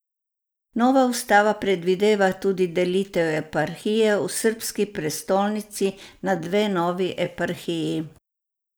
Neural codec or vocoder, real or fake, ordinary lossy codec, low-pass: none; real; none; none